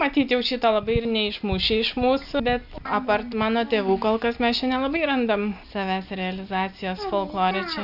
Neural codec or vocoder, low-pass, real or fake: none; 5.4 kHz; real